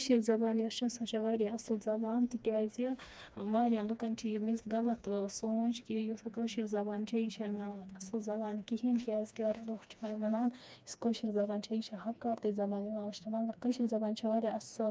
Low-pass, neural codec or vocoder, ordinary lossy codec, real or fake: none; codec, 16 kHz, 2 kbps, FreqCodec, smaller model; none; fake